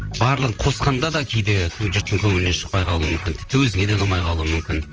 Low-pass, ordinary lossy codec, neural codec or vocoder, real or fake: 7.2 kHz; Opus, 24 kbps; vocoder, 44.1 kHz, 128 mel bands, Pupu-Vocoder; fake